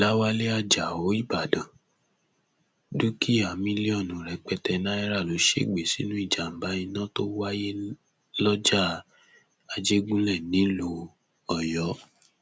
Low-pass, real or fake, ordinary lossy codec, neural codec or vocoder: none; real; none; none